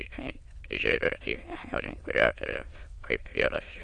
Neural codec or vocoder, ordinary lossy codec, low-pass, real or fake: autoencoder, 22.05 kHz, a latent of 192 numbers a frame, VITS, trained on many speakers; AAC, 48 kbps; 9.9 kHz; fake